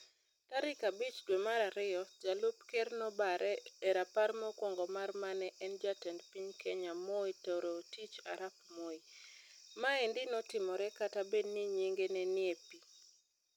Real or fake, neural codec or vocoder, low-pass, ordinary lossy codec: real; none; 19.8 kHz; none